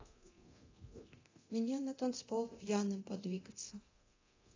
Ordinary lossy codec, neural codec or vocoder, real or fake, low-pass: AAC, 32 kbps; codec, 24 kHz, 0.9 kbps, DualCodec; fake; 7.2 kHz